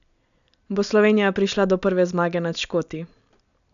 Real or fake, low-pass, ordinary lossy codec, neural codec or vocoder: real; 7.2 kHz; none; none